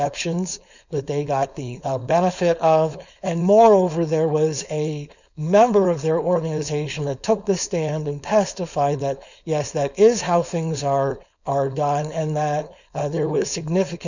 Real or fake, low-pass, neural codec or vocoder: fake; 7.2 kHz; codec, 16 kHz, 4.8 kbps, FACodec